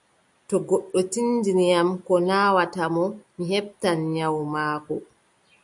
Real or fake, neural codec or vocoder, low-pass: real; none; 10.8 kHz